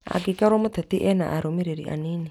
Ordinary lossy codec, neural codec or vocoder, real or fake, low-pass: none; none; real; 19.8 kHz